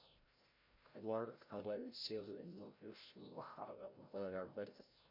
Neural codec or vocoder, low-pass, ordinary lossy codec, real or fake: codec, 16 kHz, 0.5 kbps, FreqCodec, larger model; 5.4 kHz; MP3, 32 kbps; fake